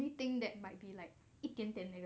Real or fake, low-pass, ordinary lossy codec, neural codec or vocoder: real; none; none; none